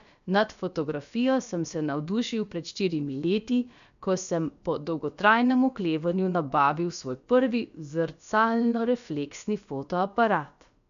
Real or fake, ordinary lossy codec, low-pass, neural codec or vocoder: fake; none; 7.2 kHz; codec, 16 kHz, about 1 kbps, DyCAST, with the encoder's durations